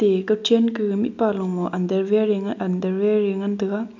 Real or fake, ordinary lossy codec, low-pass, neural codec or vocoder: real; none; 7.2 kHz; none